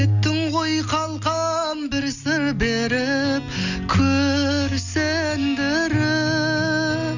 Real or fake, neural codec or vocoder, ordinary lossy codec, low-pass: real; none; none; 7.2 kHz